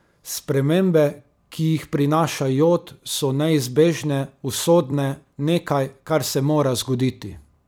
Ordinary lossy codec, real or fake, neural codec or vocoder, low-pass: none; fake; vocoder, 44.1 kHz, 128 mel bands every 256 samples, BigVGAN v2; none